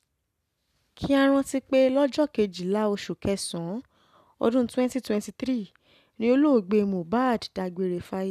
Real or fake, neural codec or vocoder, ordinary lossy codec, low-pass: real; none; none; 14.4 kHz